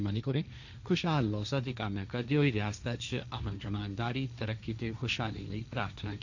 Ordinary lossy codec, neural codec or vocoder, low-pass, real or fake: none; codec, 16 kHz, 1.1 kbps, Voila-Tokenizer; 7.2 kHz; fake